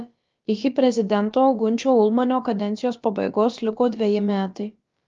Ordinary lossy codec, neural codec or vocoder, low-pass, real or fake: Opus, 32 kbps; codec, 16 kHz, about 1 kbps, DyCAST, with the encoder's durations; 7.2 kHz; fake